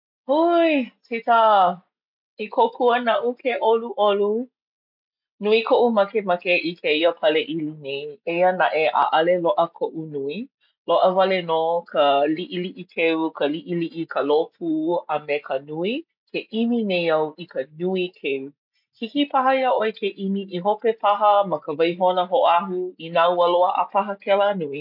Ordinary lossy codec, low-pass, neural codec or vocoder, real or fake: MP3, 48 kbps; 5.4 kHz; none; real